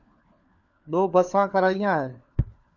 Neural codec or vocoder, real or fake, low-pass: codec, 16 kHz, 4 kbps, FunCodec, trained on LibriTTS, 50 frames a second; fake; 7.2 kHz